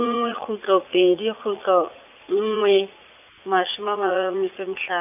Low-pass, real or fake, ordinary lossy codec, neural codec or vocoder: 3.6 kHz; fake; none; vocoder, 44.1 kHz, 80 mel bands, Vocos